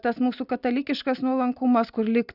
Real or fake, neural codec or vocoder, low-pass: real; none; 5.4 kHz